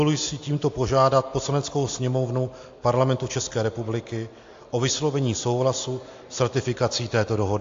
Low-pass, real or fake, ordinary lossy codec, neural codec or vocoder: 7.2 kHz; real; AAC, 48 kbps; none